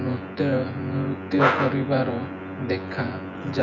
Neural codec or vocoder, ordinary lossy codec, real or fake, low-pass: vocoder, 24 kHz, 100 mel bands, Vocos; none; fake; 7.2 kHz